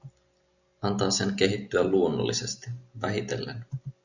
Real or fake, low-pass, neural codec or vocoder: real; 7.2 kHz; none